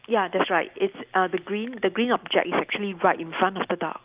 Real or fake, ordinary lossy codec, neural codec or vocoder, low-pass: real; Opus, 24 kbps; none; 3.6 kHz